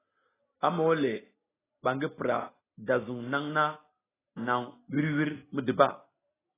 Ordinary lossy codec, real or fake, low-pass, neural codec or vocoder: AAC, 16 kbps; real; 3.6 kHz; none